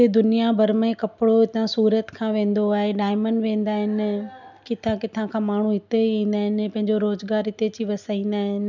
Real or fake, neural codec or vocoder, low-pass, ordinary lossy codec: real; none; 7.2 kHz; none